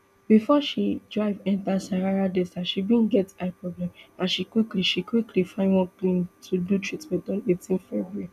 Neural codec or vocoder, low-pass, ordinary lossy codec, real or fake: none; 14.4 kHz; none; real